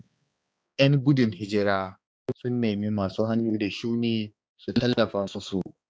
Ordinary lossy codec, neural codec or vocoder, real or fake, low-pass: none; codec, 16 kHz, 2 kbps, X-Codec, HuBERT features, trained on balanced general audio; fake; none